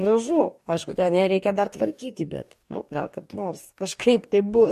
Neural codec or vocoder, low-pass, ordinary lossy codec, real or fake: codec, 44.1 kHz, 2.6 kbps, DAC; 14.4 kHz; MP3, 64 kbps; fake